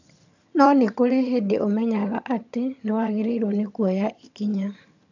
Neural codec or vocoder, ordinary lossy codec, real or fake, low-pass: vocoder, 22.05 kHz, 80 mel bands, HiFi-GAN; none; fake; 7.2 kHz